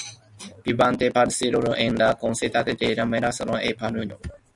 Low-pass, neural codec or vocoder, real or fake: 10.8 kHz; none; real